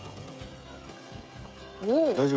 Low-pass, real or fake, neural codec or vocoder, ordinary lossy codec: none; fake; codec, 16 kHz, 16 kbps, FreqCodec, smaller model; none